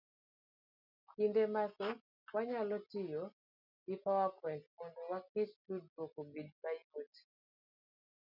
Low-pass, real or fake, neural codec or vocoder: 5.4 kHz; real; none